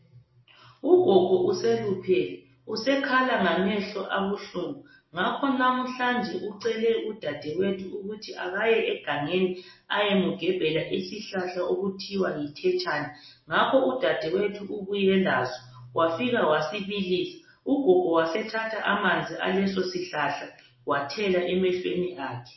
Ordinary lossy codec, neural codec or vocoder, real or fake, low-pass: MP3, 24 kbps; none; real; 7.2 kHz